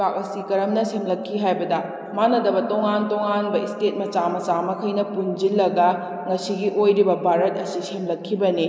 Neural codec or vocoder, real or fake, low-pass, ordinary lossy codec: none; real; none; none